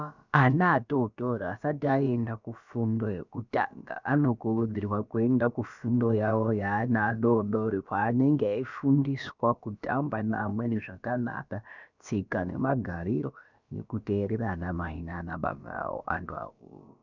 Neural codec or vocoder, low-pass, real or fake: codec, 16 kHz, about 1 kbps, DyCAST, with the encoder's durations; 7.2 kHz; fake